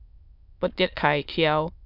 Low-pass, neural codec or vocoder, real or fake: 5.4 kHz; autoencoder, 22.05 kHz, a latent of 192 numbers a frame, VITS, trained on many speakers; fake